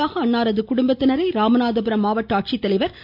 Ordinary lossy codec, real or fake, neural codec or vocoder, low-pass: none; real; none; 5.4 kHz